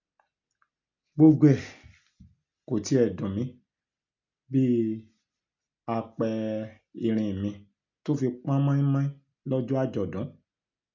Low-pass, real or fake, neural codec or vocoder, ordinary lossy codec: 7.2 kHz; real; none; MP3, 64 kbps